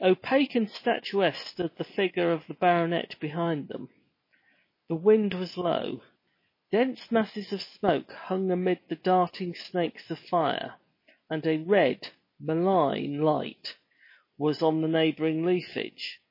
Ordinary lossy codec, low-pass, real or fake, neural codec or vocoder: MP3, 24 kbps; 5.4 kHz; real; none